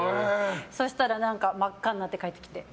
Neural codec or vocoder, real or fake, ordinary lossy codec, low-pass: none; real; none; none